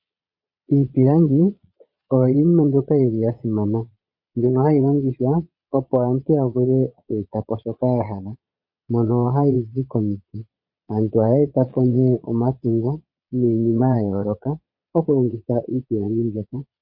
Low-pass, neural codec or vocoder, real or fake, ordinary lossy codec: 5.4 kHz; vocoder, 22.05 kHz, 80 mel bands, Vocos; fake; MP3, 32 kbps